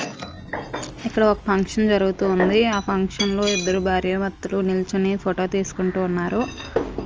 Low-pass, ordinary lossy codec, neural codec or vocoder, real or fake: 7.2 kHz; Opus, 24 kbps; none; real